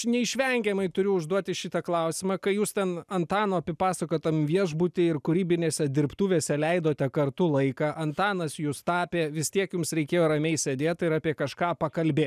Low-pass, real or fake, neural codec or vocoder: 14.4 kHz; real; none